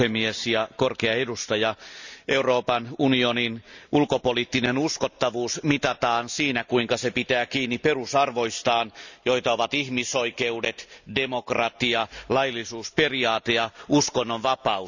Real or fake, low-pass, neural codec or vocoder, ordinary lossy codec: real; 7.2 kHz; none; none